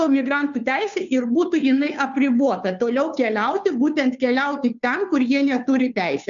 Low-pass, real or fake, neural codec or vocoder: 7.2 kHz; fake; codec, 16 kHz, 2 kbps, FunCodec, trained on Chinese and English, 25 frames a second